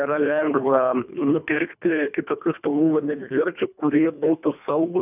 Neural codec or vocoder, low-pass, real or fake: codec, 24 kHz, 1.5 kbps, HILCodec; 3.6 kHz; fake